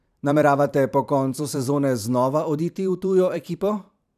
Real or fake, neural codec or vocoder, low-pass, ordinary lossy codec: fake; vocoder, 44.1 kHz, 128 mel bands every 512 samples, BigVGAN v2; 14.4 kHz; none